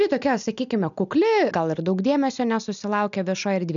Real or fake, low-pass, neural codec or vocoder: real; 7.2 kHz; none